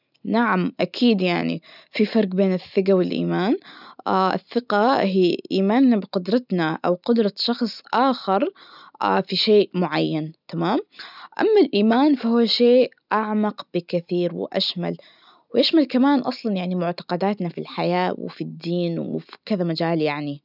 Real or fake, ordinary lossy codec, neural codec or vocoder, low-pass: real; none; none; 5.4 kHz